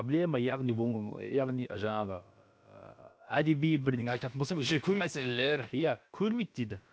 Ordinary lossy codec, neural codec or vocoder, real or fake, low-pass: none; codec, 16 kHz, about 1 kbps, DyCAST, with the encoder's durations; fake; none